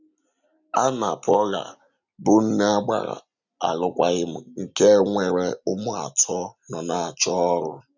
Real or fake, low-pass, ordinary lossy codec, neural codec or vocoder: real; 7.2 kHz; none; none